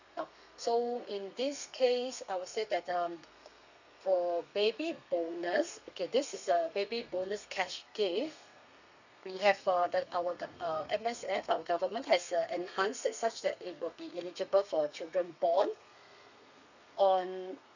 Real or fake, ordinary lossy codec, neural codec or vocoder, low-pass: fake; none; codec, 32 kHz, 1.9 kbps, SNAC; 7.2 kHz